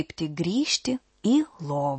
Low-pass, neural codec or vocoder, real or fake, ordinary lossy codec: 10.8 kHz; none; real; MP3, 32 kbps